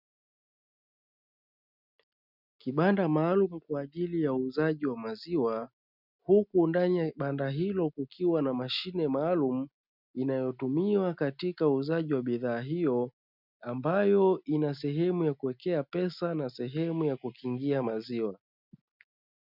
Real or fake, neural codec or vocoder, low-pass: real; none; 5.4 kHz